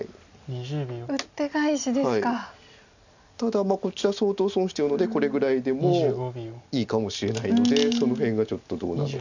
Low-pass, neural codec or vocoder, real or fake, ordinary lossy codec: 7.2 kHz; none; real; none